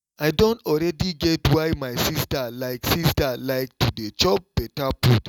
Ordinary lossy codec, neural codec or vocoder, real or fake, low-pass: none; none; real; 19.8 kHz